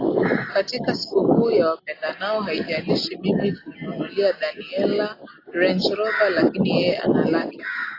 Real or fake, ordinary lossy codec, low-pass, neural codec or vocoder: real; AAC, 24 kbps; 5.4 kHz; none